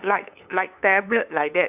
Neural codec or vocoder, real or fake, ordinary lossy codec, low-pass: codec, 16 kHz, 2 kbps, FunCodec, trained on LibriTTS, 25 frames a second; fake; none; 3.6 kHz